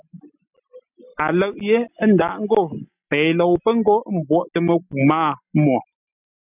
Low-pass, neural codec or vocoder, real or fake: 3.6 kHz; none; real